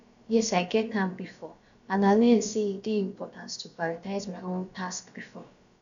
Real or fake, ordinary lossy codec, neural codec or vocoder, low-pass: fake; none; codec, 16 kHz, about 1 kbps, DyCAST, with the encoder's durations; 7.2 kHz